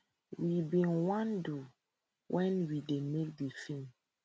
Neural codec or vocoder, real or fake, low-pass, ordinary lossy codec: none; real; none; none